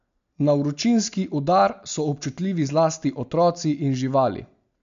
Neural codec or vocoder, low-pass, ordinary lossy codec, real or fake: none; 7.2 kHz; MP3, 64 kbps; real